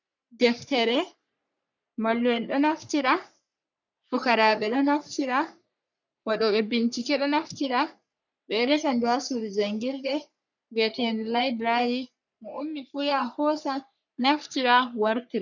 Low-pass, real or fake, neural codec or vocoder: 7.2 kHz; fake; codec, 44.1 kHz, 3.4 kbps, Pupu-Codec